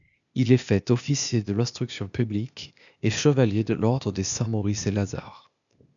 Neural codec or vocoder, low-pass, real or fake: codec, 16 kHz, 0.8 kbps, ZipCodec; 7.2 kHz; fake